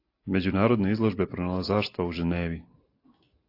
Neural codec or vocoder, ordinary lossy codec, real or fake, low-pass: none; AAC, 32 kbps; real; 5.4 kHz